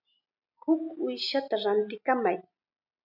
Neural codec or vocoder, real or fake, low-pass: none; real; 5.4 kHz